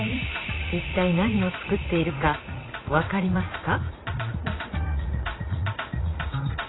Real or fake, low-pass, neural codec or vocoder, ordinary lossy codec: fake; 7.2 kHz; vocoder, 44.1 kHz, 80 mel bands, Vocos; AAC, 16 kbps